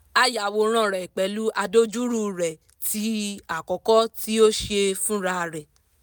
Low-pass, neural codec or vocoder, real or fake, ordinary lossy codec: none; none; real; none